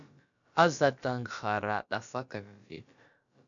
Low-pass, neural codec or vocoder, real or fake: 7.2 kHz; codec, 16 kHz, about 1 kbps, DyCAST, with the encoder's durations; fake